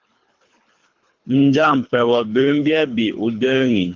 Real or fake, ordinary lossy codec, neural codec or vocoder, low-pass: fake; Opus, 32 kbps; codec, 24 kHz, 3 kbps, HILCodec; 7.2 kHz